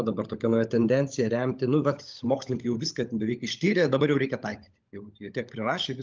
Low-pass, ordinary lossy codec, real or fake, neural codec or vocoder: 7.2 kHz; Opus, 32 kbps; fake; codec, 16 kHz, 16 kbps, FunCodec, trained on LibriTTS, 50 frames a second